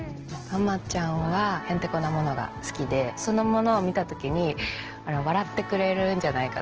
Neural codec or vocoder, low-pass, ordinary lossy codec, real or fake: none; 7.2 kHz; Opus, 16 kbps; real